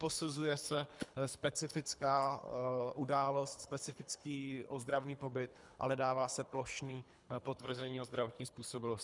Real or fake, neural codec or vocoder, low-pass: fake; codec, 24 kHz, 3 kbps, HILCodec; 10.8 kHz